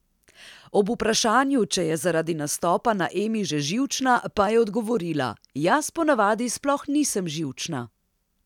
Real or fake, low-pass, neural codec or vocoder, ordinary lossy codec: real; 19.8 kHz; none; none